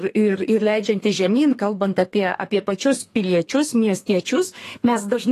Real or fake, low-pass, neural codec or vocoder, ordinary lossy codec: fake; 14.4 kHz; codec, 32 kHz, 1.9 kbps, SNAC; AAC, 48 kbps